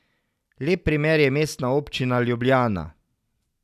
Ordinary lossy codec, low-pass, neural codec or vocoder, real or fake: none; 14.4 kHz; none; real